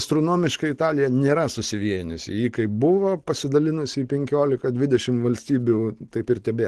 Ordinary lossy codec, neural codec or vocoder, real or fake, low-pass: Opus, 16 kbps; none; real; 10.8 kHz